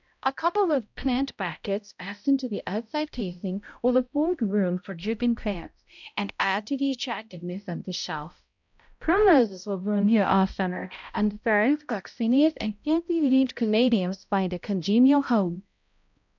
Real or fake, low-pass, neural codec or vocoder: fake; 7.2 kHz; codec, 16 kHz, 0.5 kbps, X-Codec, HuBERT features, trained on balanced general audio